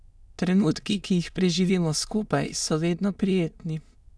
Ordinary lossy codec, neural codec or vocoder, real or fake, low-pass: none; autoencoder, 22.05 kHz, a latent of 192 numbers a frame, VITS, trained on many speakers; fake; none